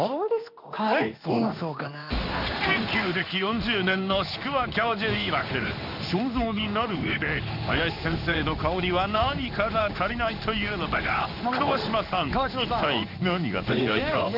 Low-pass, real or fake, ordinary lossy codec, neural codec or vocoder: 5.4 kHz; fake; none; codec, 16 kHz in and 24 kHz out, 1 kbps, XY-Tokenizer